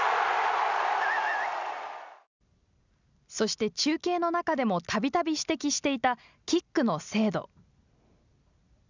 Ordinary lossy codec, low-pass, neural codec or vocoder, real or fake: none; 7.2 kHz; none; real